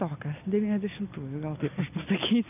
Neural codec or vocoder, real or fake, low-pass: none; real; 3.6 kHz